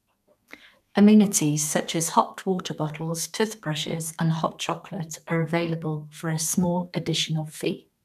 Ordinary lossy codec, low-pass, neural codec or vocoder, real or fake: none; 14.4 kHz; codec, 32 kHz, 1.9 kbps, SNAC; fake